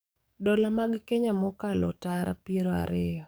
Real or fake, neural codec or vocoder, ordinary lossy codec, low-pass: fake; codec, 44.1 kHz, 7.8 kbps, DAC; none; none